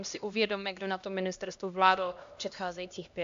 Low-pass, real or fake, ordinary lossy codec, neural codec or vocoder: 7.2 kHz; fake; AAC, 64 kbps; codec, 16 kHz, 1 kbps, X-Codec, HuBERT features, trained on LibriSpeech